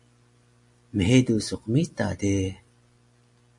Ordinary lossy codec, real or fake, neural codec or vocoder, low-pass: MP3, 48 kbps; real; none; 10.8 kHz